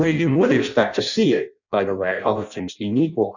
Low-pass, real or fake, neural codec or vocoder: 7.2 kHz; fake; codec, 16 kHz in and 24 kHz out, 0.6 kbps, FireRedTTS-2 codec